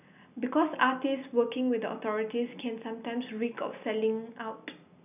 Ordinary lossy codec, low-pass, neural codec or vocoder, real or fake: none; 3.6 kHz; none; real